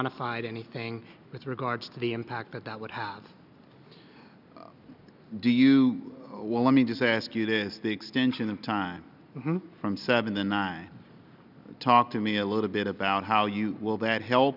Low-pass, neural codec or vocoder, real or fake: 5.4 kHz; none; real